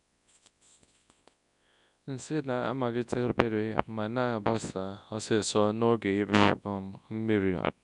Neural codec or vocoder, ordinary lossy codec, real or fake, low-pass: codec, 24 kHz, 0.9 kbps, WavTokenizer, large speech release; none; fake; 10.8 kHz